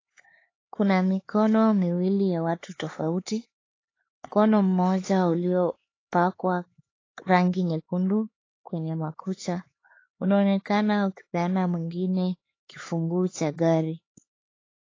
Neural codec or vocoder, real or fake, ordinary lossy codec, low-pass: codec, 16 kHz, 4 kbps, X-Codec, HuBERT features, trained on LibriSpeech; fake; AAC, 32 kbps; 7.2 kHz